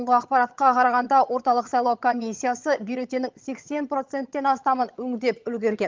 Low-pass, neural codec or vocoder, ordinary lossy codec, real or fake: 7.2 kHz; vocoder, 22.05 kHz, 80 mel bands, HiFi-GAN; Opus, 32 kbps; fake